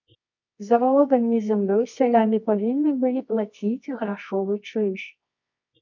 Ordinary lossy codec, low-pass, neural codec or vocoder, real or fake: MP3, 64 kbps; 7.2 kHz; codec, 24 kHz, 0.9 kbps, WavTokenizer, medium music audio release; fake